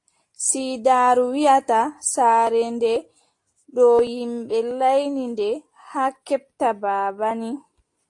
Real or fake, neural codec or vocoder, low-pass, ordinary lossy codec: real; none; 10.8 kHz; AAC, 48 kbps